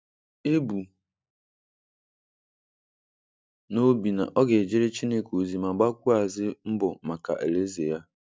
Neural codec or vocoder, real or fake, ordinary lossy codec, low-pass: none; real; none; none